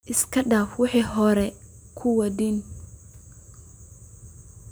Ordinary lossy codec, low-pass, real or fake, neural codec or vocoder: none; none; real; none